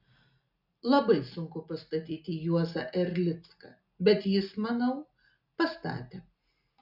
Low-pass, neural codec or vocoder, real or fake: 5.4 kHz; none; real